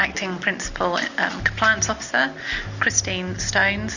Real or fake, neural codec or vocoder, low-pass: real; none; 7.2 kHz